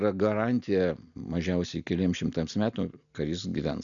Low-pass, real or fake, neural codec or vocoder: 7.2 kHz; real; none